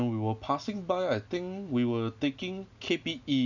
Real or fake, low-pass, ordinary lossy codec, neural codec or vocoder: real; 7.2 kHz; none; none